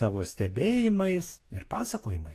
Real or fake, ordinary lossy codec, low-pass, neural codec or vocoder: fake; AAC, 64 kbps; 14.4 kHz; codec, 44.1 kHz, 2.6 kbps, DAC